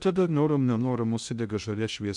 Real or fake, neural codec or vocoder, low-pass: fake; codec, 16 kHz in and 24 kHz out, 0.6 kbps, FocalCodec, streaming, 2048 codes; 10.8 kHz